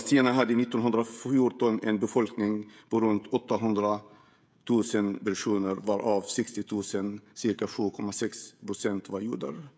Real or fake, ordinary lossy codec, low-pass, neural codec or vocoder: fake; none; none; codec, 16 kHz, 16 kbps, FreqCodec, smaller model